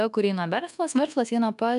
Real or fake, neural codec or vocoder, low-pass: fake; codec, 24 kHz, 1.2 kbps, DualCodec; 10.8 kHz